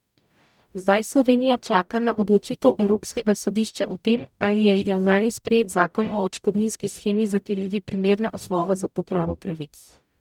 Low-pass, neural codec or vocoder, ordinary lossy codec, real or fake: 19.8 kHz; codec, 44.1 kHz, 0.9 kbps, DAC; none; fake